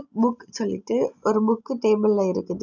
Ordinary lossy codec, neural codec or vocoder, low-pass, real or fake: none; none; 7.2 kHz; real